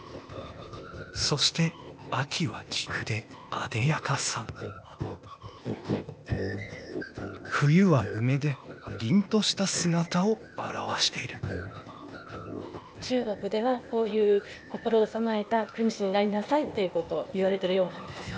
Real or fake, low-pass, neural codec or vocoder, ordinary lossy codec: fake; none; codec, 16 kHz, 0.8 kbps, ZipCodec; none